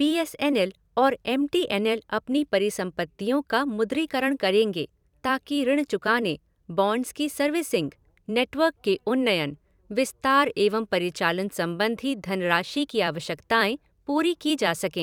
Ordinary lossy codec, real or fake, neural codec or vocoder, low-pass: none; fake; vocoder, 44.1 kHz, 128 mel bands every 256 samples, BigVGAN v2; 19.8 kHz